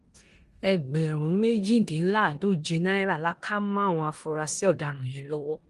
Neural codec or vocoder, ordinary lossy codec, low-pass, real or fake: codec, 16 kHz in and 24 kHz out, 0.9 kbps, LongCat-Audio-Codec, four codebook decoder; Opus, 24 kbps; 10.8 kHz; fake